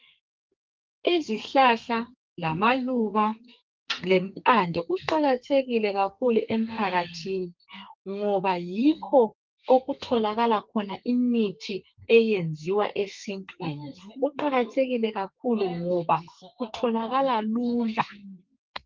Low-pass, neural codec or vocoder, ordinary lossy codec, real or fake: 7.2 kHz; codec, 32 kHz, 1.9 kbps, SNAC; Opus, 32 kbps; fake